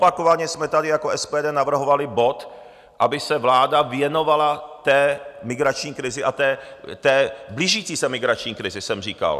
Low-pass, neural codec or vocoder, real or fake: 14.4 kHz; none; real